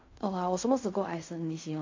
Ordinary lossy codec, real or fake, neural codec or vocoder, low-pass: MP3, 48 kbps; fake; codec, 16 kHz in and 24 kHz out, 0.4 kbps, LongCat-Audio-Codec, fine tuned four codebook decoder; 7.2 kHz